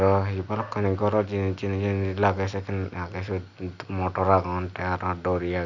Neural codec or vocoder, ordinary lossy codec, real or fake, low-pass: none; none; real; 7.2 kHz